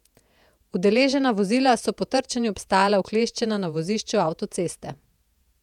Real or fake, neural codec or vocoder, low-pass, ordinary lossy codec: fake; vocoder, 48 kHz, 128 mel bands, Vocos; 19.8 kHz; none